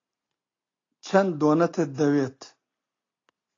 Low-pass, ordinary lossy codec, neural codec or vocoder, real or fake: 7.2 kHz; AAC, 32 kbps; none; real